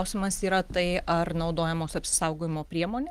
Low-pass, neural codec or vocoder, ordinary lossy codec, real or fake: 14.4 kHz; none; Opus, 24 kbps; real